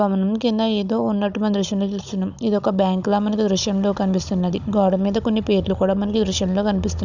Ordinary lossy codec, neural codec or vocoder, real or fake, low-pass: none; codec, 16 kHz, 16 kbps, FunCodec, trained on Chinese and English, 50 frames a second; fake; 7.2 kHz